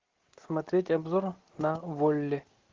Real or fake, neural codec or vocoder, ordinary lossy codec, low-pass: real; none; Opus, 16 kbps; 7.2 kHz